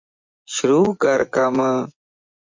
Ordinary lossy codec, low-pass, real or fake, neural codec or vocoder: MP3, 64 kbps; 7.2 kHz; fake; vocoder, 24 kHz, 100 mel bands, Vocos